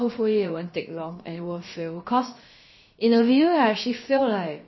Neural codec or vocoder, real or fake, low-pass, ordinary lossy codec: codec, 16 kHz, about 1 kbps, DyCAST, with the encoder's durations; fake; 7.2 kHz; MP3, 24 kbps